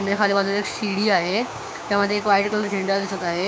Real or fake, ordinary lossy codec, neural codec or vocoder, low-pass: fake; none; codec, 16 kHz, 6 kbps, DAC; none